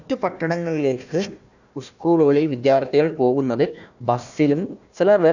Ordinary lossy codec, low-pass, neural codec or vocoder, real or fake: none; 7.2 kHz; codec, 16 kHz, 1 kbps, FunCodec, trained on Chinese and English, 50 frames a second; fake